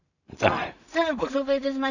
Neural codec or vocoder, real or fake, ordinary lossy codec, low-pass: codec, 16 kHz in and 24 kHz out, 0.4 kbps, LongCat-Audio-Codec, two codebook decoder; fake; none; 7.2 kHz